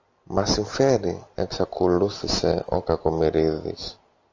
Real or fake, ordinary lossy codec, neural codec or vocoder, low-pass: real; AAC, 48 kbps; none; 7.2 kHz